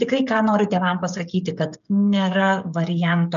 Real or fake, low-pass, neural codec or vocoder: fake; 7.2 kHz; codec, 16 kHz, 16 kbps, FreqCodec, smaller model